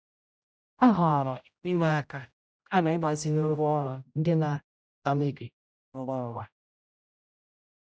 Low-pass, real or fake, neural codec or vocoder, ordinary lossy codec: none; fake; codec, 16 kHz, 0.5 kbps, X-Codec, HuBERT features, trained on general audio; none